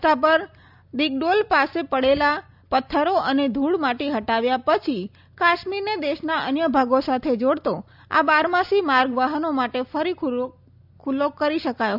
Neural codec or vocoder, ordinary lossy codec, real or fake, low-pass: none; none; real; 5.4 kHz